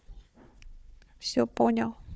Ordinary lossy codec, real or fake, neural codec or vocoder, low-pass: none; fake; codec, 16 kHz, 4 kbps, FunCodec, trained on Chinese and English, 50 frames a second; none